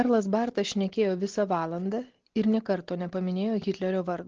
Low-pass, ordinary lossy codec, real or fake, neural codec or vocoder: 7.2 kHz; Opus, 16 kbps; real; none